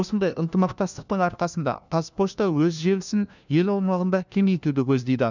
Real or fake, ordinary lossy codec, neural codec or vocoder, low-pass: fake; none; codec, 16 kHz, 1 kbps, FunCodec, trained on LibriTTS, 50 frames a second; 7.2 kHz